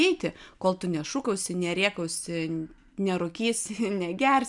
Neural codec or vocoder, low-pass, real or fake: none; 10.8 kHz; real